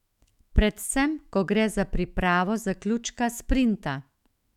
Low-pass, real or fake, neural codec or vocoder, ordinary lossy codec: 19.8 kHz; fake; autoencoder, 48 kHz, 128 numbers a frame, DAC-VAE, trained on Japanese speech; none